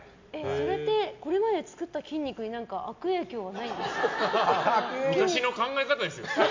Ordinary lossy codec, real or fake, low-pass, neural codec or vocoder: MP3, 64 kbps; real; 7.2 kHz; none